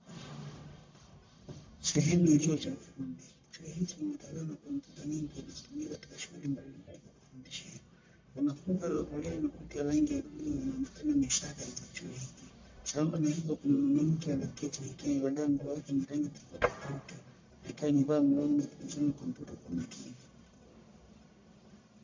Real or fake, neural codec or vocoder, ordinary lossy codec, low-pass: fake; codec, 44.1 kHz, 1.7 kbps, Pupu-Codec; MP3, 48 kbps; 7.2 kHz